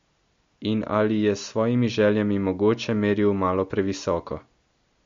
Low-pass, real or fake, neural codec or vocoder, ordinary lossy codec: 7.2 kHz; real; none; MP3, 48 kbps